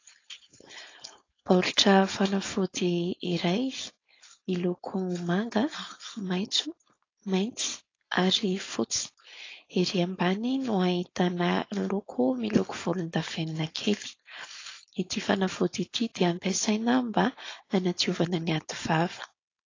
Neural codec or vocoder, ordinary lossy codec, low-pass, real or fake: codec, 16 kHz, 4.8 kbps, FACodec; AAC, 32 kbps; 7.2 kHz; fake